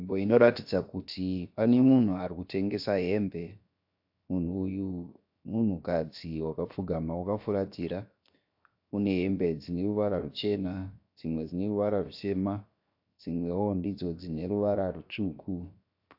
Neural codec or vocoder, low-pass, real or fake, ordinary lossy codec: codec, 16 kHz, 0.7 kbps, FocalCodec; 5.4 kHz; fake; AAC, 48 kbps